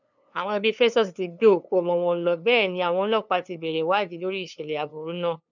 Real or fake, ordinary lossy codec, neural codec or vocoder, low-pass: fake; none; codec, 16 kHz, 2 kbps, FunCodec, trained on LibriTTS, 25 frames a second; 7.2 kHz